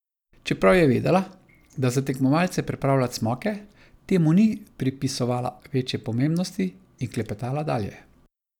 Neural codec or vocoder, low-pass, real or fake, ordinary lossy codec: none; 19.8 kHz; real; none